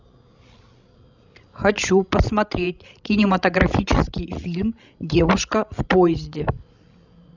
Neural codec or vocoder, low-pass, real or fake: codec, 16 kHz, 16 kbps, FreqCodec, larger model; 7.2 kHz; fake